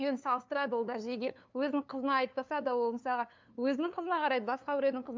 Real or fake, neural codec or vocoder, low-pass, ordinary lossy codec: fake; codec, 16 kHz, 2 kbps, FunCodec, trained on LibriTTS, 25 frames a second; 7.2 kHz; MP3, 64 kbps